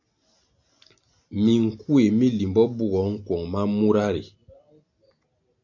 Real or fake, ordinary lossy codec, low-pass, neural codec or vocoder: real; AAC, 48 kbps; 7.2 kHz; none